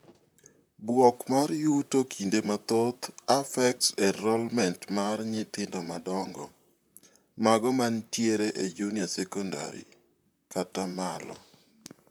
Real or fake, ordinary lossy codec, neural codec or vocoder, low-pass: fake; none; vocoder, 44.1 kHz, 128 mel bands, Pupu-Vocoder; none